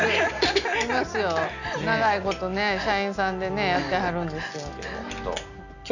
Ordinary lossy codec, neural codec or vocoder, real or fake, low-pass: none; none; real; 7.2 kHz